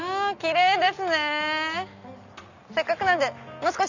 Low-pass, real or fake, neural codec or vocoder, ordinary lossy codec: 7.2 kHz; real; none; none